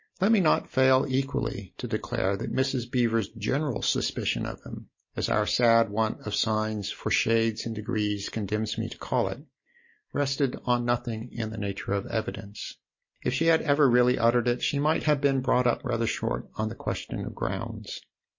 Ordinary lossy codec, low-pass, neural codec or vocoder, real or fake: MP3, 32 kbps; 7.2 kHz; none; real